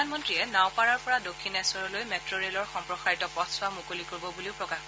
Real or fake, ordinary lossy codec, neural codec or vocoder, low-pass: real; none; none; none